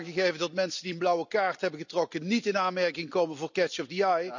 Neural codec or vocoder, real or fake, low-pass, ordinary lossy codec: none; real; 7.2 kHz; none